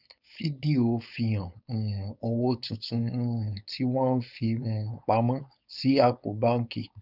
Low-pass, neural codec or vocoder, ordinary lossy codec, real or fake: 5.4 kHz; codec, 16 kHz, 4.8 kbps, FACodec; none; fake